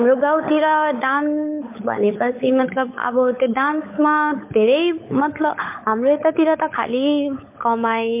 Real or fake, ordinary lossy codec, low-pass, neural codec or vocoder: fake; MP3, 24 kbps; 3.6 kHz; codec, 16 kHz, 16 kbps, FunCodec, trained on LibriTTS, 50 frames a second